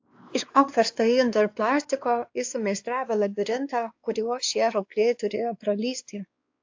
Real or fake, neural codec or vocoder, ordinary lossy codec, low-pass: fake; codec, 16 kHz, 2 kbps, X-Codec, WavLM features, trained on Multilingual LibriSpeech; AAC, 48 kbps; 7.2 kHz